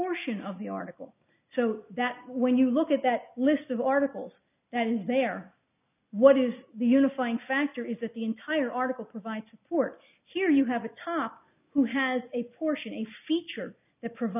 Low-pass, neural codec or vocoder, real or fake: 3.6 kHz; none; real